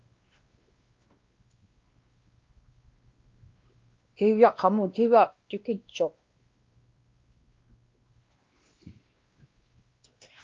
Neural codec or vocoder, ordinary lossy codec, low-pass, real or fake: codec, 16 kHz, 1 kbps, X-Codec, WavLM features, trained on Multilingual LibriSpeech; Opus, 32 kbps; 7.2 kHz; fake